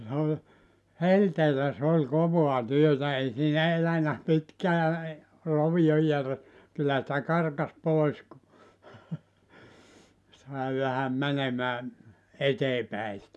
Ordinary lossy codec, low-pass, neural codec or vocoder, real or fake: none; none; none; real